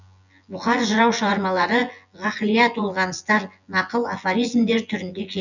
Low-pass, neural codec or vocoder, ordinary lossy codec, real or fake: 7.2 kHz; vocoder, 24 kHz, 100 mel bands, Vocos; none; fake